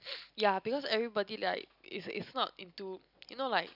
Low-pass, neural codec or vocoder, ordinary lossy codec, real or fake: 5.4 kHz; none; none; real